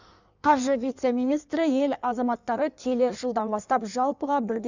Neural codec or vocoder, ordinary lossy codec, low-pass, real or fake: codec, 16 kHz in and 24 kHz out, 1.1 kbps, FireRedTTS-2 codec; none; 7.2 kHz; fake